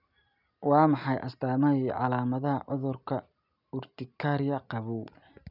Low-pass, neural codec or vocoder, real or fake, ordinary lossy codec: 5.4 kHz; none; real; none